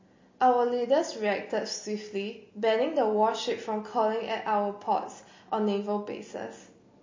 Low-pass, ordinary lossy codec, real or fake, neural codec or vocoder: 7.2 kHz; MP3, 32 kbps; real; none